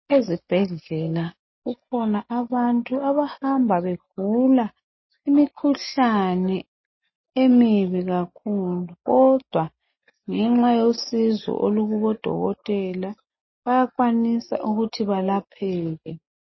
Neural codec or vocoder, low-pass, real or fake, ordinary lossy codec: none; 7.2 kHz; real; MP3, 24 kbps